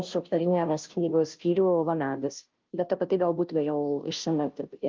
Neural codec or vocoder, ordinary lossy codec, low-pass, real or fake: codec, 16 kHz, 0.5 kbps, FunCodec, trained on Chinese and English, 25 frames a second; Opus, 32 kbps; 7.2 kHz; fake